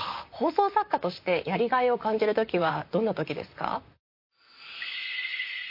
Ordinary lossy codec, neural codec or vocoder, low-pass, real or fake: MP3, 32 kbps; vocoder, 44.1 kHz, 128 mel bands, Pupu-Vocoder; 5.4 kHz; fake